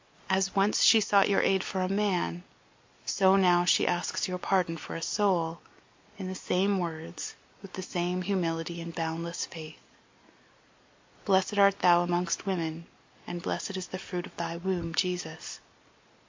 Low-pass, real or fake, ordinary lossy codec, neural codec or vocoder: 7.2 kHz; real; MP3, 48 kbps; none